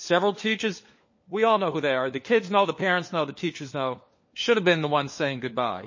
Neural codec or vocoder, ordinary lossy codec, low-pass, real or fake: codec, 16 kHz, 4 kbps, FunCodec, trained on LibriTTS, 50 frames a second; MP3, 32 kbps; 7.2 kHz; fake